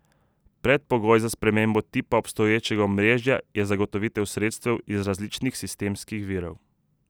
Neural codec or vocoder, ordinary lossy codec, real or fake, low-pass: none; none; real; none